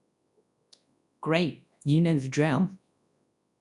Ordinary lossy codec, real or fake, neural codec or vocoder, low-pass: none; fake; codec, 24 kHz, 0.9 kbps, WavTokenizer, large speech release; 10.8 kHz